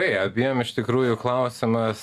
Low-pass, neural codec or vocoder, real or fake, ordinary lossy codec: 14.4 kHz; none; real; Opus, 64 kbps